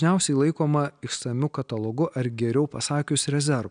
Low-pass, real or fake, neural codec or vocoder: 9.9 kHz; real; none